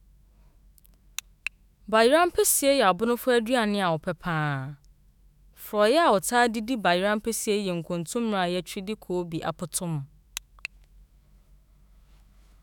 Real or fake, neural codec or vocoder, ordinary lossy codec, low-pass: fake; autoencoder, 48 kHz, 128 numbers a frame, DAC-VAE, trained on Japanese speech; none; none